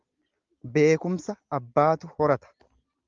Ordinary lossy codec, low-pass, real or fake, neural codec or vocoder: Opus, 32 kbps; 7.2 kHz; real; none